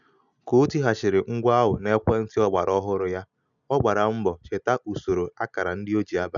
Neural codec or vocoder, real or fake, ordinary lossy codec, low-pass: none; real; none; 7.2 kHz